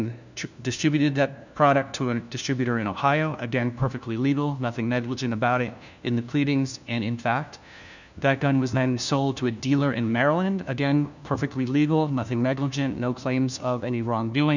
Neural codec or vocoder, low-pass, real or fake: codec, 16 kHz, 1 kbps, FunCodec, trained on LibriTTS, 50 frames a second; 7.2 kHz; fake